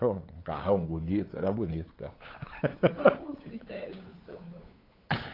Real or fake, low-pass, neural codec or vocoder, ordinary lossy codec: fake; 5.4 kHz; codec, 16 kHz, 8 kbps, FunCodec, trained on LibriTTS, 25 frames a second; AAC, 24 kbps